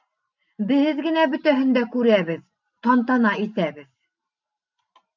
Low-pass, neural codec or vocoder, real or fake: 7.2 kHz; vocoder, 44.1 kHz, 128 mel bands every 512 samples, BigVGAN v2; fake